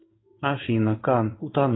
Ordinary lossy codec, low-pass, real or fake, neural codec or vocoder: AAC, 16 kbps; 7.2 kHz; fake; codec, 16 kHz in and 24 kHz out, 1 kbps, XY-Tokenizer